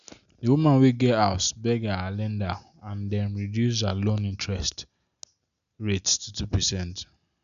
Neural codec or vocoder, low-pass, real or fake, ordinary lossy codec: none; 7.2 kHz; real; none